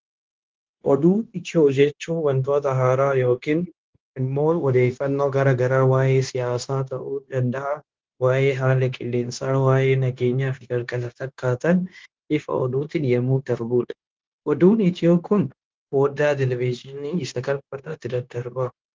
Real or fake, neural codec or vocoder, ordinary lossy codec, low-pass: fake; codec, 16 kHz, 0.9 kbps, LongCat-Audio-Codec; Opus, 16 kbps; 7.2 kHz